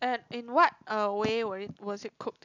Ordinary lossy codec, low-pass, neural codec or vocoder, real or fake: none; 7.2 kHz; none; real